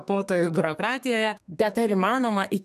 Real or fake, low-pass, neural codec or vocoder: fake; 14.4 kHz; codec, 32 kHz, 1.9 kbps, SNAC